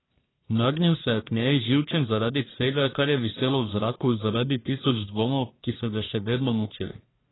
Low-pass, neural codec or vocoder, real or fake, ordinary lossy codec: 7.2 kHz; codec, 44.1 kHz, 1.7 kbps, Pupu-Codec; fake; AAC, 16 kbps